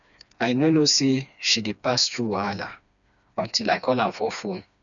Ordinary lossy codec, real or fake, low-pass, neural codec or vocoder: none; fake; 7.2 kHz; codec, 16 kHz, 2 kbps, FreqCodec, smaller model